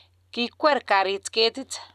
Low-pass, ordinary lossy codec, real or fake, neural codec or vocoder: 14.4 kHz; none; real; none